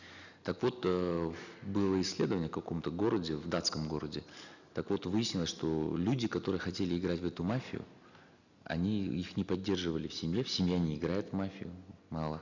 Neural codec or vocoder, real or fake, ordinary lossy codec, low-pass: none; real; none; 7.2 kHz